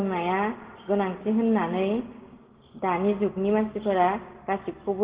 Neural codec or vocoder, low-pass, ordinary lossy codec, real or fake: none; 3.6 kHz; Opus, 16 kbps; real